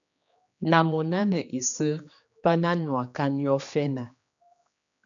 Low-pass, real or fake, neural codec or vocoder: 7.2 kHz; fake; codec, 16 kHz, 2 kbps, X-Codec, HuBERT features, trained on general audio